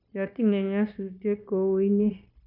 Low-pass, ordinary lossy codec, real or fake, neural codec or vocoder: 5.4 kHz; none; fake; codec, 16 kHz, 0.9 kbps, LongCat-Audio-Codec